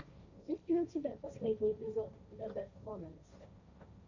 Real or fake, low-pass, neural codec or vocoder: fake; 7.2 kHz; codec, 16 kHz, 1.1 kbps, Voila-Tokenizer